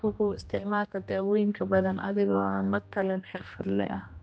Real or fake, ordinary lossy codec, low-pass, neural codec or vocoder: fake; none; none; codec, 16 kHz, 1 kbps, X-Codec, HuBERT features, trained on general audio